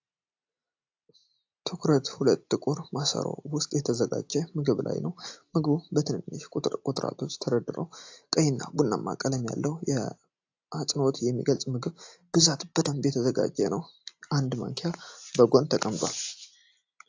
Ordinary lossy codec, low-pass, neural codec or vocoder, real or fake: AAC, 48 kbps; 7.2 kHz; none; real